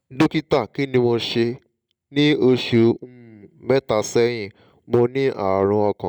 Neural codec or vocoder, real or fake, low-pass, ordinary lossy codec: none; real; 19.8 kHz; none